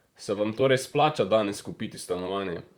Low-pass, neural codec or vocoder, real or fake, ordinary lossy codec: 19.8 kHz; vocoder, 44.1 kHz, 128 mel bands, Pupu-Vocoder; fake; Opus, 64 kbps